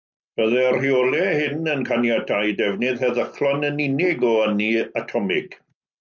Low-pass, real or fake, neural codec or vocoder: 7.2 kHz; real; none